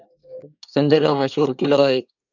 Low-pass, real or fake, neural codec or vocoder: 7.2 kHz; fake; codec, 24 kHz, 1 kbps, SNAC